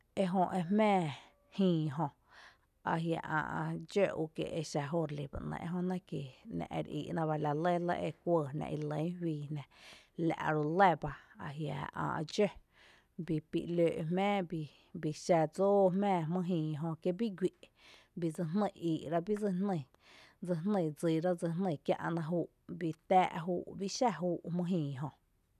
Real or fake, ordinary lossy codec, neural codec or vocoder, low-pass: real; none; none; 14.4 kHz